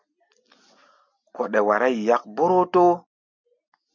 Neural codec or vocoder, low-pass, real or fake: none; 7.2 kHz; real